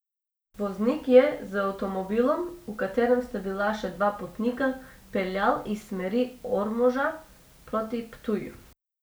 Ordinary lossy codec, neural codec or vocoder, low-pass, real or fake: none; none; none; real